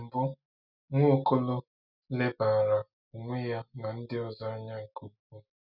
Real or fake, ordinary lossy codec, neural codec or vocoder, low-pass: real; none; none; 5.4 kHz